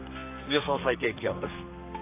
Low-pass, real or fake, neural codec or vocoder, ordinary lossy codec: 3.6 kHz; fake; codec, 32 kHz, 1.9 kbps, SNAC; AAC, 24 kbps